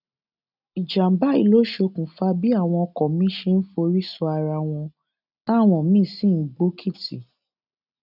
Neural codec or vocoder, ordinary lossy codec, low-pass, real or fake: none; none; 5.4 kHz; real